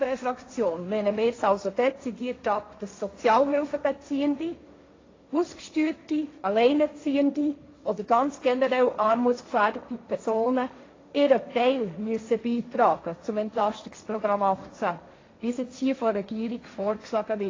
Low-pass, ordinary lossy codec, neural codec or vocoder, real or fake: 7.2 kHz; AAC, 32 kbps; codec, 16 kHz, 1.1 kbps, Voila-Tokenizer; fake